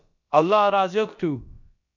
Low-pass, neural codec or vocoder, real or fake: 7.2 kHz; codec, 16 kHz, about 1 kbps, DyCAST, with the encoder's durations; fake